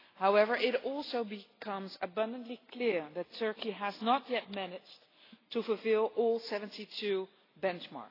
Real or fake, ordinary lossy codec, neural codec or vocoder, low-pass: real; AAC, 24 kbps; none; 5.4 kHz